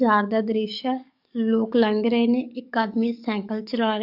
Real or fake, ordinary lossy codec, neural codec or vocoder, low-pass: fake; none; codec, 44.1 kHz, 7.8 kbps, DAC; 5.4 kHz